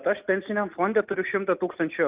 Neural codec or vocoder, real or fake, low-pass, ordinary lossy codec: none; real; 3.6 kHz; Opus, 24 kbps